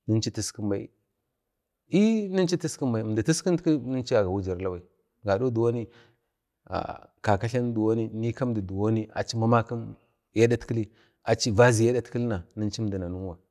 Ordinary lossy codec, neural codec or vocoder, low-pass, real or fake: none; none; 14.4 kHz; real